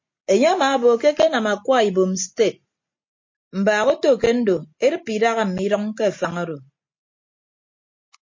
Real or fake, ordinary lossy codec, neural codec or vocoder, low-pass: real; MP3, 32 kbps; none; 7.2 kHz